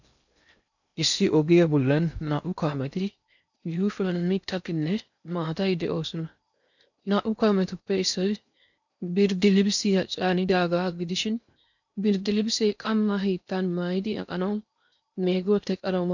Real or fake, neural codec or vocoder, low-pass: fake; codec, 16 kHz in and 24 kHz out, 0.6 kbps, FocalCodec, streaming, 2048 codes; 7.2 kHz